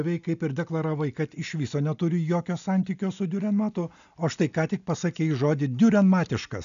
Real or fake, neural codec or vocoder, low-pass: real; none; 7.2 kHz